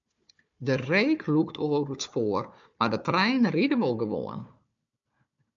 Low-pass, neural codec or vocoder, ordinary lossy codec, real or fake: 7.2 kHz; codec, 16 kHz, 4 kbps, FunCodec, trained on Chinese and English, 50 frames a second; AAC, 64 kbps; fake